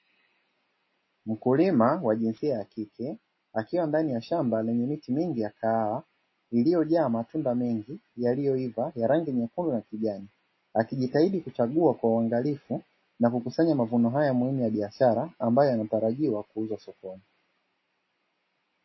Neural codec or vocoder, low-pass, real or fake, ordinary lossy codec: none; 7.2 kHz; real; MP3, 24 kbps